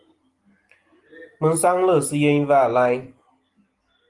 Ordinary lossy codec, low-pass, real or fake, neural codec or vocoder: Opus, 24 kbps; 10.8 kHz; real; none